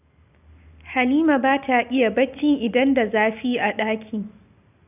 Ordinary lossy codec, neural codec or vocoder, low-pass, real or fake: none; none; 3.6 kHz; real